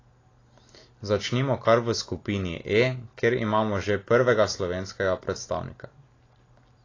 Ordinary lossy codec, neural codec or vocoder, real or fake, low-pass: AAC, 32 kbps; none; real; 7.2 kHz